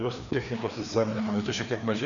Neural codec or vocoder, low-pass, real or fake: codec, 16 kHz, 2 kbps, FreqCodec, larger model; 7.2 kHz; fake